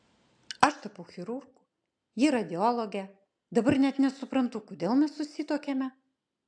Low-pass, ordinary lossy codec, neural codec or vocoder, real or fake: 9.9 kHz; AAC, 64 kbps; none; real